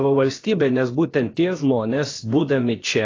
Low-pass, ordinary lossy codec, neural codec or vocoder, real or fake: 7.2 kHz; AAC, 32 kbps; codec, 16 kHz, about 1 kbps, DyCAST, with the encoder's durations; fake